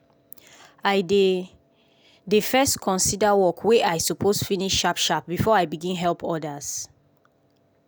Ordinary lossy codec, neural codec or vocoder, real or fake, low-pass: none; none; real; none